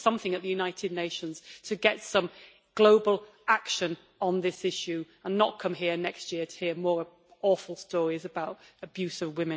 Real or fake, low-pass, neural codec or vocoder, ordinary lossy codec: real; none; none; none